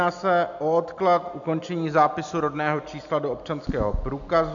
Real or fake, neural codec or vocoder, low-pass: real; none; 7.2 kHz